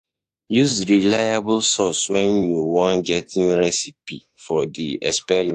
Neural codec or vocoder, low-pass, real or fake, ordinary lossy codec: autoencoder, 48 kHz, 32 numbers a frame, DAC-VAE, trained on Japanese speech; 14.4 kHz; fake; AAC, 64 kbps